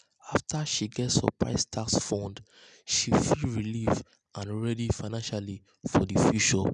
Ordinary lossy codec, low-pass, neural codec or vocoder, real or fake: none; 9.9 kHz; none; real